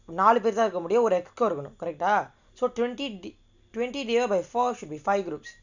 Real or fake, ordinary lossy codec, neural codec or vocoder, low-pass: real; none; none; 7.2 kHz